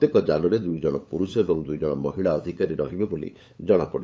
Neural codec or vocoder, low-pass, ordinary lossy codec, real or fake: codec, 16 kHz, 8 kbps, FunCodec, trained on LibriTTS, 25 frames a second; none; none; fake